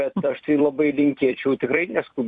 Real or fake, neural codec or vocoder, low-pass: fake; vocoder, 44.1 kHz, 128 mel bands every 256 samples, BigVGAN v2; 9.9 kHz